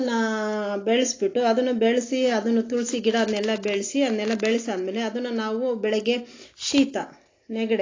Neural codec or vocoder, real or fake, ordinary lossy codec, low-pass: none; real; AAC, 32 kbps; 7.2 kHz